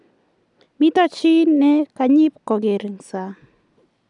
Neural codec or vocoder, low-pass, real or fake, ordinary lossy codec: none; 10.8 kHz; real; none